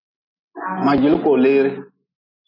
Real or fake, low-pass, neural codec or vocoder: real; 5.4 kHz; none